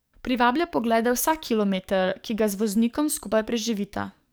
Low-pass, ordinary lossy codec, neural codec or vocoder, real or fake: none; none; codec, 44.1 kHz, 7.8 kbps, DAC; fake